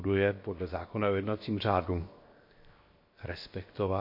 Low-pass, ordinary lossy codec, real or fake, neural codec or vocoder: 5.4 kHz; MP3, 32 kbps; fake; codec, 16 kHz, 0.7 kbps, FocalCodec